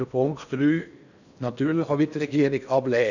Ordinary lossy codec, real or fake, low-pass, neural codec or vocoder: none; fake; 7.2 kHz; codec, 16 kHz in and 24 kHz out, 0.8 kbps, FocalCodec, streaming, 65536 codes